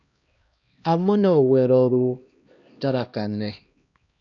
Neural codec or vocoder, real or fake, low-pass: codec, 16 kHz, 1 kbps, X-Codec, HuBERT features, trained on LibriSpeech; fake; 7.2 kHz